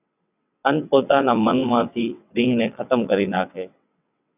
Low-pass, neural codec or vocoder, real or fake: 3.6 kHz; vocoder, 22.05 kHz, 80 mel bands, WaveNeXt; fake